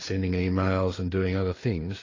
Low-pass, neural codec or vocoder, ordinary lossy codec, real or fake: 7.2 kHz; codec, 16 kHz, 4 kbps, FreqCodec, larger model; AAC, 32 kbps; fake